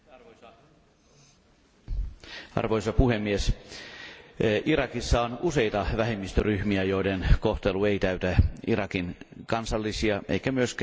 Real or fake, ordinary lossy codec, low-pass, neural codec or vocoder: real; none; none; none